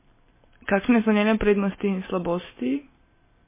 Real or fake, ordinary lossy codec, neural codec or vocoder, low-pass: real; MP3, 16 kbps; none; 3.6 kHz